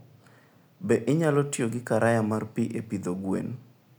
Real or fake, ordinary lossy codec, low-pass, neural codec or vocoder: real; none; none; none